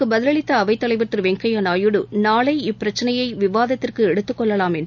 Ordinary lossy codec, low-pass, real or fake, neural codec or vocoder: none; 7.2 kHz; real; none